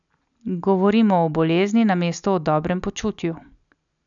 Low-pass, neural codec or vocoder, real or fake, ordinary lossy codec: 7.2 kHz; none; real; none